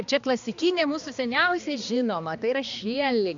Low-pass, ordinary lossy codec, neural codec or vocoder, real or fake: 7.2 kHz; AAC, 64 kbps; codec, 16 kHz, 2 kbps, X-Codec, HuBERT features, trained on balanced general audio; fake